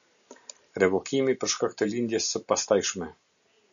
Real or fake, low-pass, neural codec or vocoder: real; 7.2 kHz; none